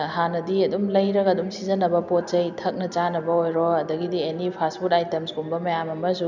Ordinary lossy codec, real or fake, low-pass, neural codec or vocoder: none; real; 7.2 kHz; none